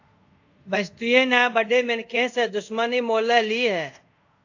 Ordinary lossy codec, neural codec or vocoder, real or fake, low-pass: AAC, 48 kbps; codec, 24 kHz, 0.5 kbps, DualCodec; fake; 7.2 kHz